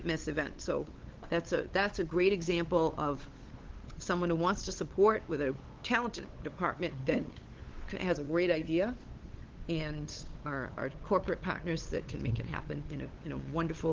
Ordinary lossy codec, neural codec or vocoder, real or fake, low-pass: Opus, 16 kbps; codec, 16 kHz, 8 kbps, FunCodec, trained on LibriTTS, 25 frames a second; fake; 7.2 kHz